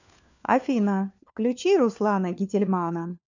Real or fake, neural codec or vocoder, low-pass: fake; codec, 16 kHz, 4 kbps, FunCodec, trained on LibriTTS, 50 frames a second; 7.2 kHz